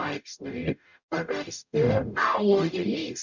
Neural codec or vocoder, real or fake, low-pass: codec, 44.1 kHz, 0.9 kbps, DAC; fake; 7.2 kHz